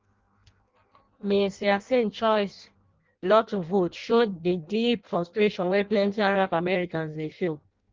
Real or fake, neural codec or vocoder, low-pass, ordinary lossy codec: fake; codec, 16 kHz in and 24 kHz out, 0.6 kbps, FireRedTTS-2 codec; 7.2 kHz; Opus, 32 kbps